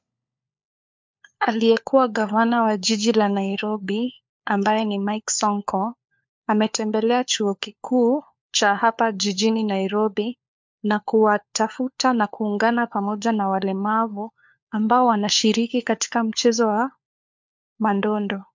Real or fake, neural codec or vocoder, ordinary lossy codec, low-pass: fake; codec, 16 kHz, 4 kbps, FunCodec, trained on LibriTTS, 50 frames a second; MP3, 64 kbps; 7.2 kHz